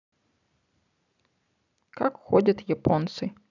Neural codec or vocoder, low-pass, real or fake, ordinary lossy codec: none; 7.2 kHz; real; none